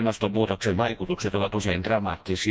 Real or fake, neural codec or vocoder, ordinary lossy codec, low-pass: fake; codec, 16 kHz, 1 kbps, FreqCodec, smaller model; none; none